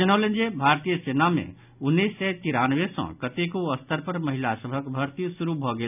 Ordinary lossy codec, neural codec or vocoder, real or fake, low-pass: none; none; real; 3.6 kHz